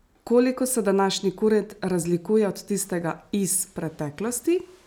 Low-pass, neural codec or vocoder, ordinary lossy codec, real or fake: none; none; none; real